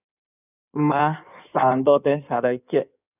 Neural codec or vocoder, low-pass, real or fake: codec, 16 kHz in and 24 kHz out, 1.1 kbps, FireRedTTS-2 codec; 3.6 kHz; fake